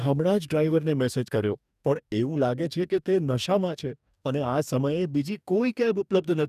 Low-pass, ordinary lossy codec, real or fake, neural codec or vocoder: 14.4 kHz; none; fake; codec, 44.1 kHz, 2.6 kbps, DAC